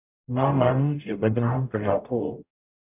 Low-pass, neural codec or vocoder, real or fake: 3.6 kHz; codec, 44.1 kHz, 0.9 kbps, DAC; fake